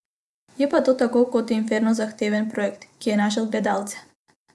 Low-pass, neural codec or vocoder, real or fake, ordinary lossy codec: none; vocoder, 24 kHz, 100 mel bands, Vocos; fake; none